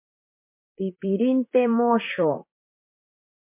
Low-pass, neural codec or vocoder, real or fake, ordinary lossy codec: 3.6 kHz; vocoder, 44.1 kHz, 128 mel bands, Pupu-Vocoder; fake; MP3, 24 kbps